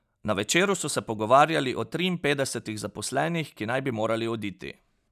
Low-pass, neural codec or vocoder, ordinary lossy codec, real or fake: 14.4 kHz; none; none; real